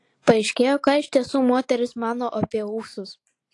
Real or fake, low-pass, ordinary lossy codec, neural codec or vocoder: real; 10.8 kHz; AAC, 48 kbps; none